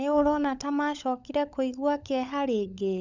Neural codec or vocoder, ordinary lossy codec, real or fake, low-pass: codec, 16 kHz, 16 kbps, FunCodec, trained on LibriTTS, 50 frames a second; none; fake; 7.2 kHz